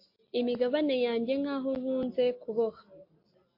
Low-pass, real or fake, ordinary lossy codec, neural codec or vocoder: 5.4 kHz; real; MP3, 32 kbps; none